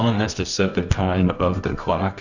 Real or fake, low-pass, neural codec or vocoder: fake; 7.2 kHz; codec, 24 kHz, 0.9 kbps, WavTokenizer, medium music audio release